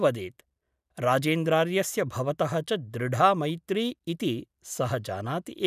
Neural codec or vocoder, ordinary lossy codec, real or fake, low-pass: none; none; real; 14.4 kHz